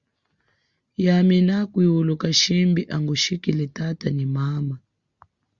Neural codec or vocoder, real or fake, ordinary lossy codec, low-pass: none; real; Opus, 64 kbps; 7.2 kHz